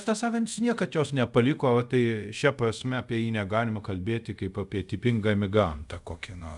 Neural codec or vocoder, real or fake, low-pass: codec, 24 kHz, 0.5 kbps, DualCodec; fake; 10.8 kHz